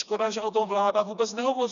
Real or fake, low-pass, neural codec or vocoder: fake; 7.2 kHz; codec, 16 kHz, 2 kbps, FreqCodec, smaller model